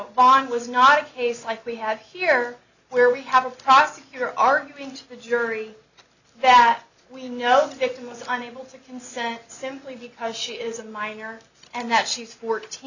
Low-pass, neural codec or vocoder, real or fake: 7.2 kHz; none; real